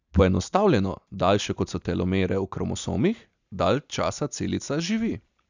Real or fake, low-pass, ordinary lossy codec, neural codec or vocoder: real; 7.2 kHz; none; none